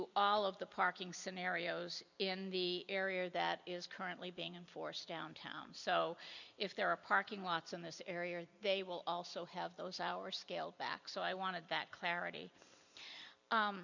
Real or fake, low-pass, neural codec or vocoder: fake; 7.2 kHz; vocoder, 44.1 kHz, 128 mel bands every 256 samples, BigVGAN v2